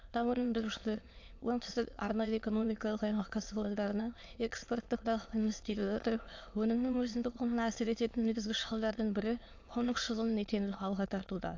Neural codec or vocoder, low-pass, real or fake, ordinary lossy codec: autoencoder, 22.05 kHz, a latent of 192 numbers a frame, VITS, trained on many speakers; 7.2 kHz; fake; AAC, 48 kbps